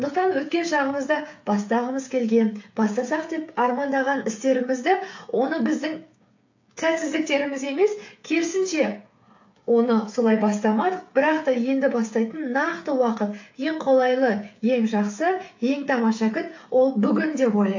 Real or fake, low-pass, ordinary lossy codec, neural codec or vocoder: fake; 7.2 kHz; AAC, 48 kbps; codec, 16 kHz, 8 kbps, FreqCodec, smaller model